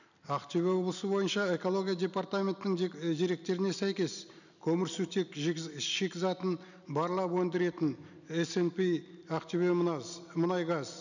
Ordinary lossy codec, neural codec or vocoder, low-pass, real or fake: none; none; 7.2 kHz; real